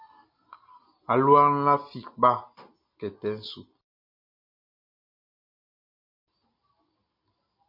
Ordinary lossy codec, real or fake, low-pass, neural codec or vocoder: MP3, 32 kbps; real; 5.4 kHz; none